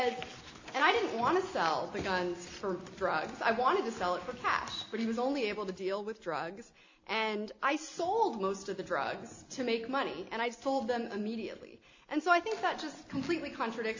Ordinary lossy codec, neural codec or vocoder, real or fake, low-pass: AAC, 48 kbps; none; real; 7.2 kHz